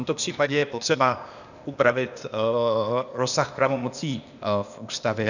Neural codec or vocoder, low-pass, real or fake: codec, 16 kHz, 0.8 kbps, ZipCodec; 7.2 kHz; fake